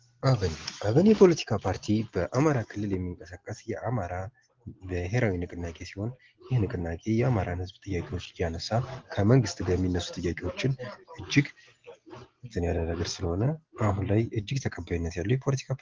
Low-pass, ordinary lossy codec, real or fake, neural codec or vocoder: 7.2 kHz; Opus, 16 kbps; real; none